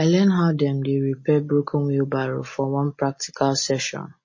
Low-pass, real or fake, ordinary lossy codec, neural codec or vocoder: 7.2 kHz; real; MP3, 32 kbps; none